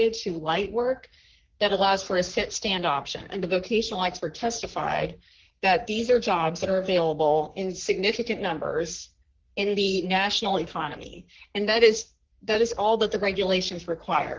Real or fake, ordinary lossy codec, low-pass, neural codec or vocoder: fake; Opus, 16 kbps; 7.2 kHz; codec, 44.1 kHz, 3.4 kbps, Pupu-Codec